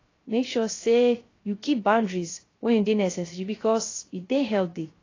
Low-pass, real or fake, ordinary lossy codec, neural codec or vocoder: 7.2 kHz; fake; AAC, 32 kbps; codec, 16 kHz, 0.3 kbps, FocalCodec